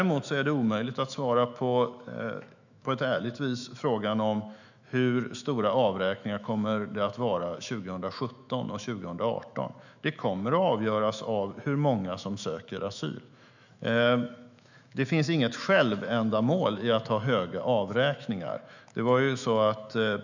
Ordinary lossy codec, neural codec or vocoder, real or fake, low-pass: none; autoencoder, 48 kHz, 128 numbers a frame, DAC-VAE, trained on Japanese speech; fake; 7.2 kHz